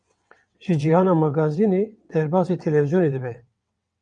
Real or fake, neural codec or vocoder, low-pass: fake; vocoder, 22.05 kHz, 80 mel bands, WaveNeXt; 9.9 kHz